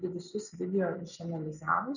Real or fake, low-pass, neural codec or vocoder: real; 7.2 kHz; none